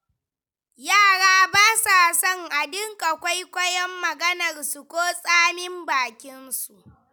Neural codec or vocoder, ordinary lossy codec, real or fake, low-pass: none; none; real; none